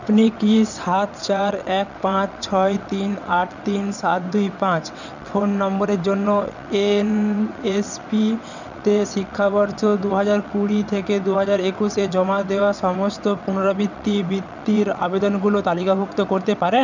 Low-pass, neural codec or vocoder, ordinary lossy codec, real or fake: 7.2 kHz; vocoder, 22.05 kHz, 80 mel bands, WaveNeXt; none; fake